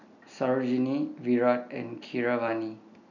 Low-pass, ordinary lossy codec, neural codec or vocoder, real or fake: 7.2 kHz; none; none; real